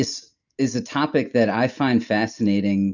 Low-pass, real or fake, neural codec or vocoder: 7.2 kHz; real; none